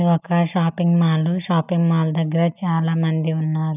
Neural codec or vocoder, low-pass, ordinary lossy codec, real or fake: none; 3.6 kHz; none; real